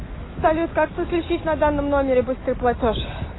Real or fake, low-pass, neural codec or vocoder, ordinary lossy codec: real; 7.2 kHz; none; AAC, 16 kbps